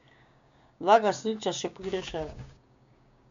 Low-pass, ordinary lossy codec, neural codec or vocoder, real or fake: 7.2 kHz; MP3, 64 kbps; codec, 16 kHz, 6 kbps, DAC; fake